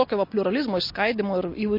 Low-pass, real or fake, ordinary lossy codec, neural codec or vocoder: 5.4 kHz; real; MP3, 32 kbps; none